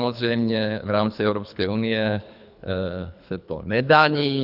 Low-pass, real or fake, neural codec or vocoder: 5.4 kHz; fake; codec, 24 kHz, 3 kbps, HILCodec